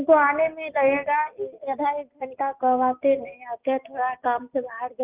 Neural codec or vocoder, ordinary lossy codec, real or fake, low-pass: none; Opus, 24 kbps; real; 3.6 kHz